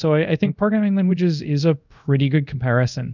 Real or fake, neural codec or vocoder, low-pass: fake; codec, 24 kHz, 0.5 kbps, DualCodec; 7.2 kHz